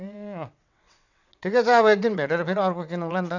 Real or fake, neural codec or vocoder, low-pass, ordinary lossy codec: fake; vocoder, 22.05 kHz, 80 mel bands, WaveNeXt; 7.2 kHz; none